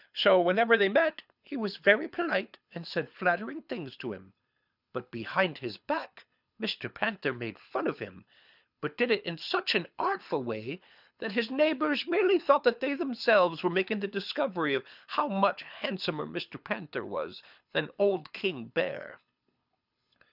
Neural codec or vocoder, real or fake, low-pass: codec, 24 kHz, 6 kbps, HILCodec; fake; 5.4 kHz